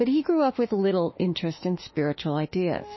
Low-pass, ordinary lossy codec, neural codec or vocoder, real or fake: 7.2 kHz; MP3, 24 kbps; autoencoder, 48 kHz, 32 numbers a frame, DAC-VAE, trained on Japanese speech; fake